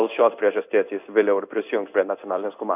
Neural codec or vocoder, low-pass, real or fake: codec, 16 kHz in and 24 kHz out, 1 kbps, XY-Tokenizer; 3.6 kHz; fake